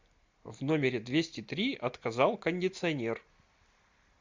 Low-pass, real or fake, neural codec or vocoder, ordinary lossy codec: 7.2 kHz; real; none; MP3, 64 kbps